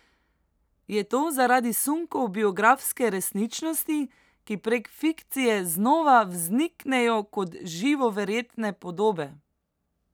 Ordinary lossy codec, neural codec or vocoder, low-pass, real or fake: none; none; none; real